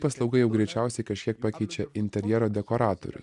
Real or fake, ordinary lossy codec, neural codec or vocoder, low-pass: real; MP3, 96 kbps; none; 10.8 kHz